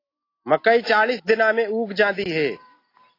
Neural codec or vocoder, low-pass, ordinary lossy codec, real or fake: none; 5.4 kHz; AAC, 24 kbps; real